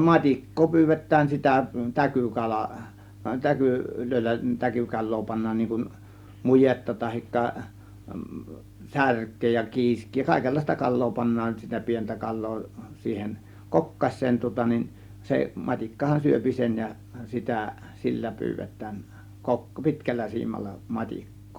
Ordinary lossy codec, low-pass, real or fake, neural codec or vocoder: Opus, 64 kbps; 19.8 kHz; real; none